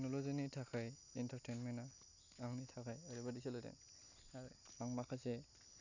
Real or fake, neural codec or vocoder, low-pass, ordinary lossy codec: real; none; 7.2 kHz; none